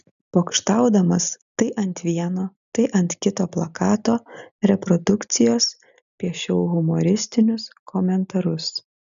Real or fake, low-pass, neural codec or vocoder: real; 7.2 kHz; none